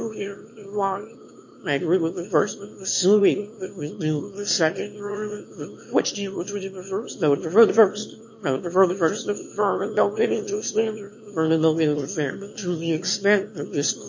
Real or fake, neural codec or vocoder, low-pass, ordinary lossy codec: fake; autoencoder, 22.05 kHz, a latent of 192 numbers a frame, VITS, trained on one speaker; 7.2 kHz; MP3, 32 kbps